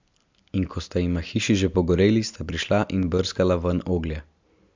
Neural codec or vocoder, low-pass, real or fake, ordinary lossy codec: none; 7.2 kHz; real; MP3, 64 kbps